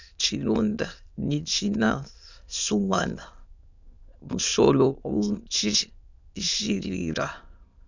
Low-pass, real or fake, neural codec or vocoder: 7.2 kHz; fake; autoencoder, 22.05 kHz, a latent of 192 numbers a frame, VITS, trained on many speakers